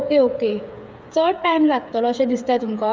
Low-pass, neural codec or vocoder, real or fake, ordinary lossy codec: none; codec, 16 kHz, 8 kbps, FreqCodec, smaller model; fake; none